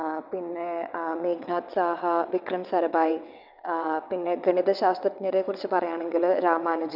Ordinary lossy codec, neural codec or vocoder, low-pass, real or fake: none; vocoder, 22.05 kHz, 80 mel bands, WaveNeXt; 5.4 kHz; fake